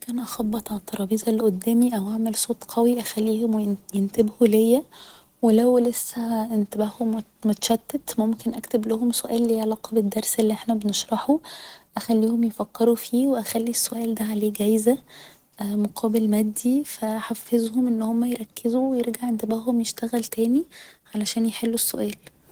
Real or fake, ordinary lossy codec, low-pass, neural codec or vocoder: real; Opus, 16 kbps; 19.8 kHz; none